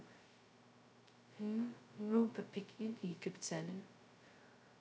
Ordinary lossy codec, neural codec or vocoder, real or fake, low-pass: none; codec, 16 kHz, 0.2 kbps, FocalCodec; fake; none